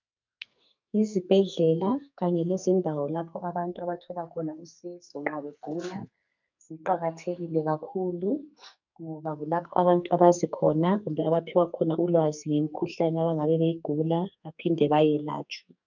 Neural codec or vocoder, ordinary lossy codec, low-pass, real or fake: codec, 44.1 kHz, 2.6 kbps, SNAC; MP3, 64 kbps; 7.2 kHz; fake